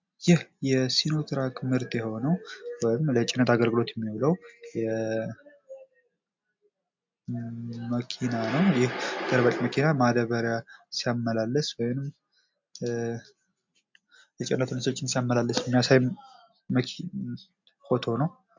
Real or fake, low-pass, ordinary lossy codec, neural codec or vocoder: real; 7.2 kHz; MP3, 64 kbps; none